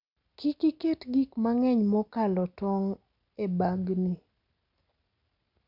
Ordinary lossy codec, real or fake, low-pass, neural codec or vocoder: none; real; 5.4 kHz; none